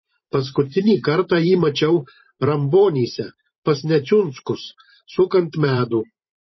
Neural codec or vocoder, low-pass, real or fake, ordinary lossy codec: vocoder, 44.1 kHz, 128 mel bands every 512 samples, BigVGAN v2; 7.2 kHz; fake; MP3, 24 kbps